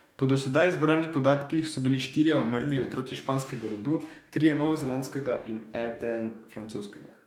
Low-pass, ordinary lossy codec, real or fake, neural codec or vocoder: 19.8 kHz; none; fake; codec, 44.1 kHz, 2.6 kbps, DAC